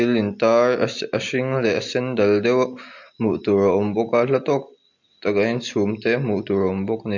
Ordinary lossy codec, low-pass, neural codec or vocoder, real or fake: MP3, 48 kbps; 7.2 kHz; none; real